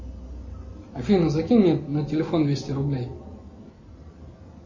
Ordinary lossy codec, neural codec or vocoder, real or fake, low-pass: MP3, 32 kbps; none; real; 7.2 kHz